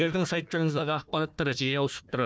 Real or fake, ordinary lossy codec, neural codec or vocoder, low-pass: fake; none; codec, 16 kHz, 1 kbps, FunCodec, trained on Chinese and English, 50 frames a second; none